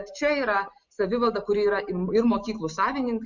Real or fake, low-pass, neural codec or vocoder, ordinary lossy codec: real; 7.2 kHz; none; Opus, 64 kbps